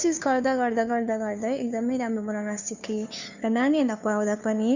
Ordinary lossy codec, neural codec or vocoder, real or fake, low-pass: none; codec, 16 kHz, 2 kbps, FunCodec, trained on Chinese and English, 25 frames a second; fake; 7.2 kHz